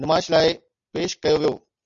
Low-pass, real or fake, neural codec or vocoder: 7.2 kHz; real; none